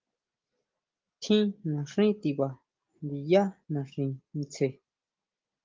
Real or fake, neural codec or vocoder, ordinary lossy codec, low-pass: real; none; Opus, 32 kbps; 7.2 kHz